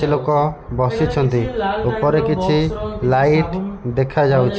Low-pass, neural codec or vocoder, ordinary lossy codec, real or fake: none; none; none; real